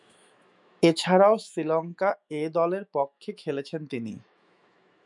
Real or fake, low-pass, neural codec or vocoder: fake; 10.8 kHz; autoencoder, 48 kHz, 128 numbers a frame, DAC-VAE, trained on Japanese speech